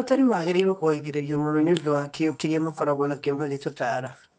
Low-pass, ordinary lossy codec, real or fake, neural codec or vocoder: 10.8 kHz; MP3, 96 kbps; fake; codec, 24 kHz, 0.9 kbps, WavTokenizer, medium music audio release